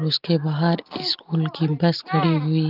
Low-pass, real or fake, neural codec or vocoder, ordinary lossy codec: 5.4 kHz; real; none; Opus, 32 kbps